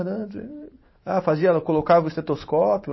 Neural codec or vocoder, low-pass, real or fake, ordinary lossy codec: vocoder, 44.1 kHz, 128 mel bands every 512 samples, BigVGAN v2; 7.2 kHz; fake; MP3, 24 kbps